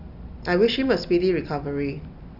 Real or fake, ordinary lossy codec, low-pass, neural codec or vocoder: real; AAC, 48 kbps; 5.4 kHz; none